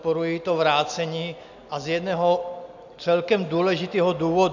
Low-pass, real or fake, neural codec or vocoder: 7.2 kHz; real; none